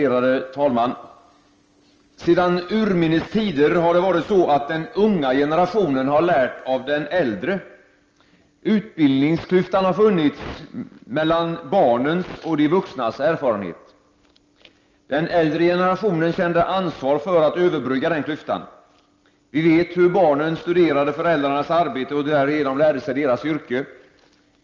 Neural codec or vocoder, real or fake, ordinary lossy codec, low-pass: none; real; Opus, 24 kbps; 7.2 kHz